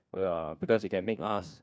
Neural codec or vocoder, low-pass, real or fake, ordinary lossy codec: codec, 16 kHz, 1 kbps, FunCodec, trained on LibriTTS, 50 frames a second; none; fake; none